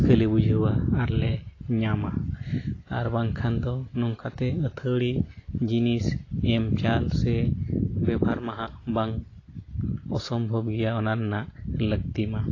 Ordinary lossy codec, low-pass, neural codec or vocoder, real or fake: AAC, 32 kbps; 7.2 kHz; none; real